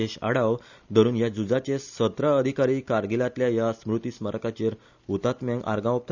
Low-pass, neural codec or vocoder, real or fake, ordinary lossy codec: 7.2 kHz; none; real; none